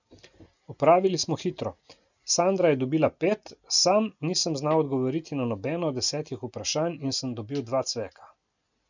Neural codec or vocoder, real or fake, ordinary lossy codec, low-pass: none; real; none; 7.2 kHz